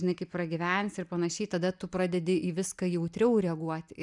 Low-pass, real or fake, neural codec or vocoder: 10.8 kHz; real; none